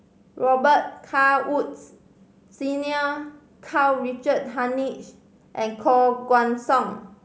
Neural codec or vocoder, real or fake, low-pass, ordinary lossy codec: none; real; none; none